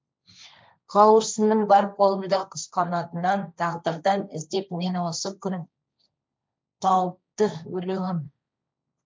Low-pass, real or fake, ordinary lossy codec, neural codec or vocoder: none; fake; none; codec, 16 kHz, 1.1 kbps, Voila-Tokenizer